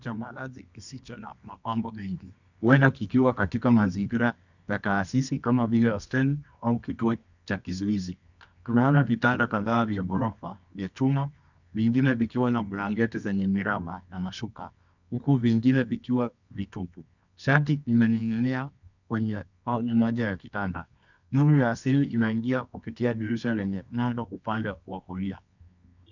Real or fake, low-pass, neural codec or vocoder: fake; 7.2 kHz; codec, 24 kHz, 0.9 kbps, WavTokenizer, medium music audio release